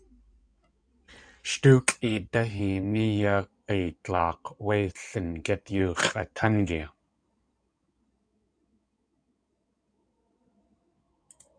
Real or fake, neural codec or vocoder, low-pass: fake; codec, 16 kHz in and 24 kHz out, 2.2 kbps, FireRedTTS-2 codec; 9.9 kHz